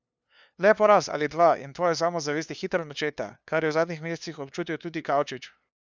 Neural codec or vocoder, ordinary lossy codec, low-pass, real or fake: codec, 16 kHz, 2 kbps, FunCodec, trained on LibriTTS, 25 frames a second; none; none; fake